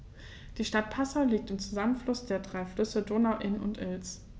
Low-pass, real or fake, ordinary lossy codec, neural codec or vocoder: none; real; none; none